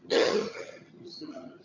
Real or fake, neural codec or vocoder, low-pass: fake; vocoder, 22.05 kHz, 80 mel bands, HiFi-GAN; 7.2 kHz